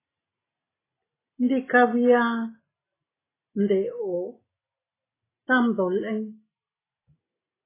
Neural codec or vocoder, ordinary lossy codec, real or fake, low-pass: none; AAC, 16 kbps; real; 3.6 kHz